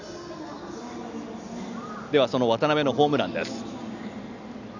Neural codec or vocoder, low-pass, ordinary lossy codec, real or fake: none; 7.2 kHz; none; real